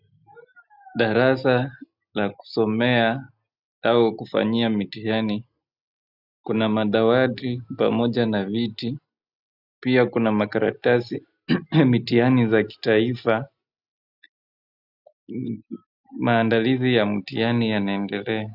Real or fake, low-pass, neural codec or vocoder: real; 5.4 kHz; none